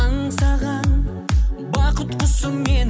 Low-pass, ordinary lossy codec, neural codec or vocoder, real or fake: none; none; none; real